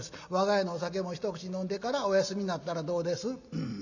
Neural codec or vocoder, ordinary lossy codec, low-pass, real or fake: none; none; 7.2 kHz; real